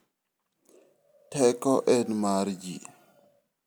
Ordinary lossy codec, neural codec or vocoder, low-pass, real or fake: none; none; none; real